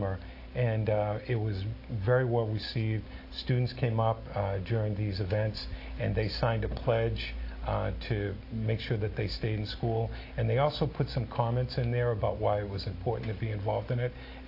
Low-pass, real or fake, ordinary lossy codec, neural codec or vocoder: 5.4 kHz; real; AAC, 32 kbps; none